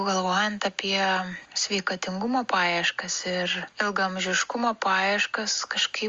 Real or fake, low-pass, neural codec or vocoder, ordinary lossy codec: real; 7.2 kHz; none; Opus, 32 kbps